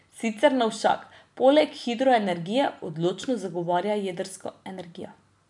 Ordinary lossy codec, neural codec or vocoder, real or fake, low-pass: none; none; real; 10.8 kHz